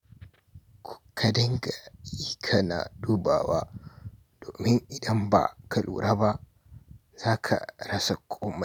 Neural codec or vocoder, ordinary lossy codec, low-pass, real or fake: none; none; none; real